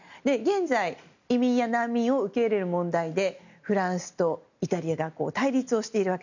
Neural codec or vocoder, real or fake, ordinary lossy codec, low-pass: none; real; none; 7.2 kHz